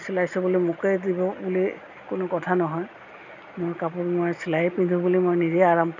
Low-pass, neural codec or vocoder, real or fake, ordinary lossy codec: 7.2 kHz; none; real; none